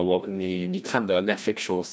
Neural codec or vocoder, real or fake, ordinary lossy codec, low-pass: codec, 16 kHz, 1 kbps, FreqCodec, larger model; fake; none; none